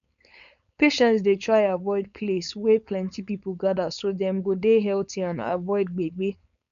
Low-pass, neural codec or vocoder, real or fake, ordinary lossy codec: 7.2 kHz; codec, 16 kHz, 4.8 kbps, FACodec; fake; none